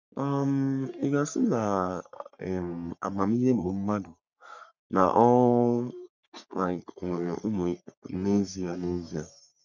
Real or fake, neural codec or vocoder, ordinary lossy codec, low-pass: fake; codec, 44.1 kHz, 3.4 kbps, Pupu-Codec; none; 7.2 kHz